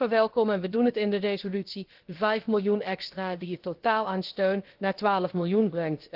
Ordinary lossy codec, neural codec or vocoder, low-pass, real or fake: Opus, 16 kbps; codec, 16 kHz, about 1 kbps, DyCAST, with the encoder's durations; 5.4 kHz; fake